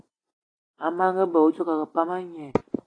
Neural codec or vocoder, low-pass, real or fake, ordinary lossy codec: vocoder, 44.1 kHz, 128 mel bands every 256 samples, BigVGAN v2; 9.9 kHz; fake; AAC, 32 kbps